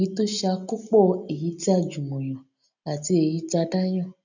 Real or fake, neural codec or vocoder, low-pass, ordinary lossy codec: real; none; 7.2 kHz; none